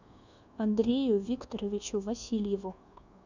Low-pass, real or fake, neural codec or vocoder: 7.2 kHz; fake; codec, 24 kHz, 1.2 kbps, DualCodec